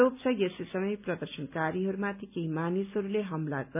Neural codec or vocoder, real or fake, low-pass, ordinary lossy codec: none; real; 3.6 kHz; none